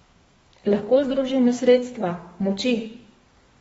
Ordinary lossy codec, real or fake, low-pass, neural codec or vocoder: AAC, 24 kbps; fake; 14.4 kHz; codec, 32 kHz, 1.9 kbps, SNAC